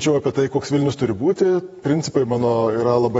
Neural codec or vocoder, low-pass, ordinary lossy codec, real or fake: vocoder, 48 kHz, 128 mel bands, Vocos; 19.8 kHz; AAC, 24 kbps; fake